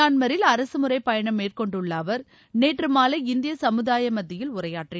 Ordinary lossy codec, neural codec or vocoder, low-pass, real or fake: none; none; none; real